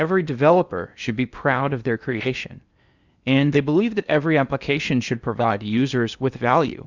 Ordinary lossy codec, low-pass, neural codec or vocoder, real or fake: Opus, 64 kbps; 7.2 kHz; codec, 16 kHz in and 24 kHz out, 0.8 kbps, FocalCodec, streaming, 65536 codes; fake